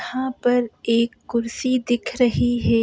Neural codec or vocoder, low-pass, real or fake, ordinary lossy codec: none; none; real; none